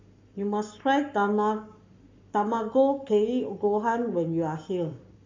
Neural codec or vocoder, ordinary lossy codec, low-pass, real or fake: codec, 44.1 kHz, 7.8 kbps, Pupu-Codec; MP3, 64 kbps; 7.2 kHz; fake